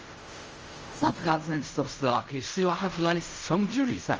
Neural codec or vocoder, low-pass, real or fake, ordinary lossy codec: codec, 16 kHz in and 24 kHz out, 0.4 kbps, LongCat-Audio-Codec, fine tuned four codebook decoder; 7.2 kHz; fake; Opus, 24 kbps